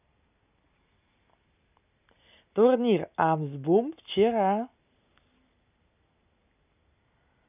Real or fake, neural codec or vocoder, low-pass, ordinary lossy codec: real; none; 3.6 kHz; none